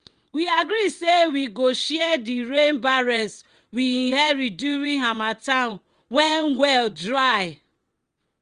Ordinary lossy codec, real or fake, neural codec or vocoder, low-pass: Opus, 32 kbps; fake; vocoder, 22.05 kHz, 80 mel bands, WaveNeXt; 9.9 kHz